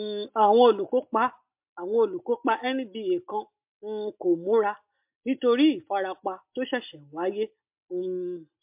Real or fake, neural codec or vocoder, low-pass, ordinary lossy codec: real; none; 3.6 kHz; MP3, 32 kbps